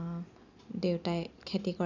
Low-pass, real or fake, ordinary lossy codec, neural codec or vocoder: 7.2 kHz; real; none; none